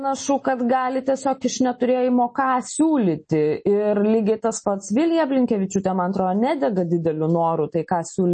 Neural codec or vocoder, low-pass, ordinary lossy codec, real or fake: none; 10.8 kHz; MP3, 32 kbps; real